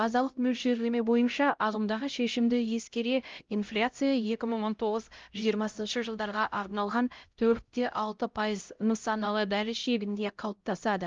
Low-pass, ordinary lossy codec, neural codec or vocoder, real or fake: 7.2 kHz; Opus, 32 kbps; codec, 16 kHz, 0.5 kbps, X-Codec, HuBERT features, trained on LibriSpeech; fake